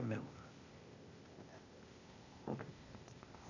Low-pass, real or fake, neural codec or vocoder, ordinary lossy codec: 7.2 kHz; fake; codec, 16 kHz, 0.8 kbps, ZipCodec; AAC, 48 kbps